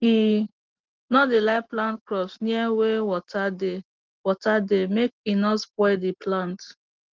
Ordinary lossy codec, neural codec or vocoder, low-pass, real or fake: Opus, 16 kbps; none; 7.2 kHz; real